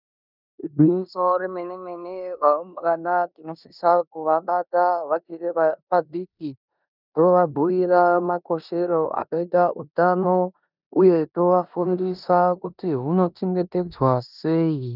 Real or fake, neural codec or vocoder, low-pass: fake; codec, 16 kHz in and 24 kHz out, 0.9 kbps, LongCat-Audio-Codec, four codebook decoder; 5.4 kHz